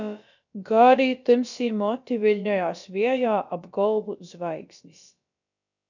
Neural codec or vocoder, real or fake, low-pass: codec, 16 kHz, about 1 kbps, DyCAST, with the encoder's durations; fake; 7.2 kHz